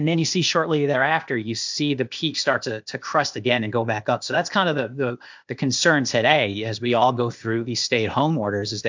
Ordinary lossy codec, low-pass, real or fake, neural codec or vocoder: MP3, 64 kbps; 7.2 kHz; fake; codec, 16 kHz, 0.8 kbps, ZipCodec